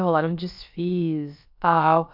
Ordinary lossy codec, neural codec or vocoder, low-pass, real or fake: MP3, 48 kbps; codec, 16 kHz, about 1 kbps, DyCAST, with the encoder's durations; 5.4 kHz; fake